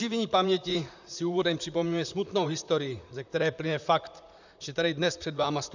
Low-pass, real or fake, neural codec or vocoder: 7.2 kHz; fake; vocoder, 44.1 kHz, 128 mel bands, Pupu-Vocoder